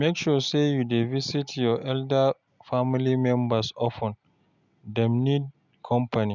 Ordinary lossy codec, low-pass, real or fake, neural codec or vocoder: none; 7.2 kHz; real; none